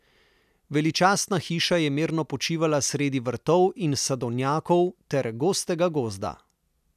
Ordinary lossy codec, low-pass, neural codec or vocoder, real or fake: none; 14.4 kHz; none; real